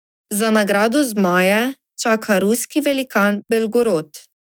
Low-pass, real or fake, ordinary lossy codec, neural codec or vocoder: none; fake; none; codec, 44.1 kHz, 7.8 kbps, DAC